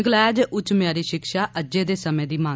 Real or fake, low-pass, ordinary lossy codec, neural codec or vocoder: real; none; none; none